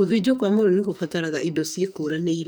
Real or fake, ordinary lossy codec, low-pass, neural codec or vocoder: fake; none; none; codec, 44.1 kHz, 2.6 kbps, SNAC